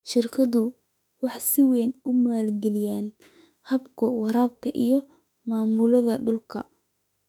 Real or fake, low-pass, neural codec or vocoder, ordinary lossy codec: fake; 19.8 kHz; autoencoder, 48 kHz, 32 numbers a frame, DAC-VAE, trained on Japanese speech; none